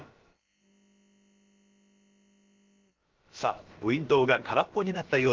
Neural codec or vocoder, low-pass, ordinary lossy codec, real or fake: codec, 16 kHz, about 1 kbps, DyCAST, with the encoder's durations; 7.2 kHz; Opus, 32 kbps; fake